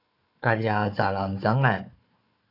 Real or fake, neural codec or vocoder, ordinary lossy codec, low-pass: fake; codec, 16 kHz, 4 kbps, FunCodec, trained on Chinese and English, 50 frames a second; AAC, 32 kbps; 5.4 kHz